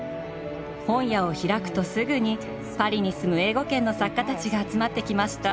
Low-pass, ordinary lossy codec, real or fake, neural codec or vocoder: none; none; real; none